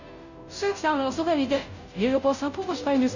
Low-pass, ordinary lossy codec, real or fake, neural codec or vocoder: 7.2 kHz; none; fake; codec, 16 kHz, 0.5 kbps, FunCodec, trained on Chinese and English, 25 frames a second